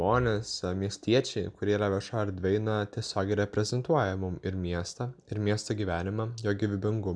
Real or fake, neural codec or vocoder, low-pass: real; none; 9.9 kHz